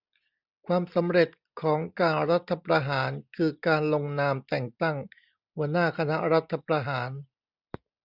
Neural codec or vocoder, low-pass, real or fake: none; 5.4 kHz; real